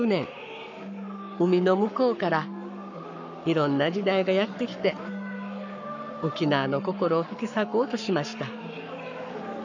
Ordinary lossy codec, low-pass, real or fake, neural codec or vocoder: none; 7.2 kHz; fake; codec, 44.1 kHz, 7.8 kbps, Pupu-Codec